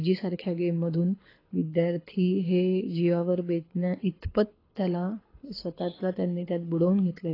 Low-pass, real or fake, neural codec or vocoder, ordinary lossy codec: 5.4 kHz; fake; codec, 24 kHz, 6 kbps, HILCodec; AAC, 24 kbps